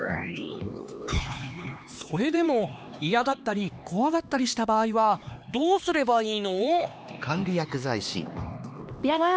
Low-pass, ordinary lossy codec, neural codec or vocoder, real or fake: none; none; codec, 16 kHz, 2 kbps, X-Codec, HuBERT features, trained on LibriSpeech; fake